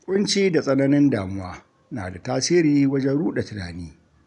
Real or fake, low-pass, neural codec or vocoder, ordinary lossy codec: real; 10.8 kHz; none; none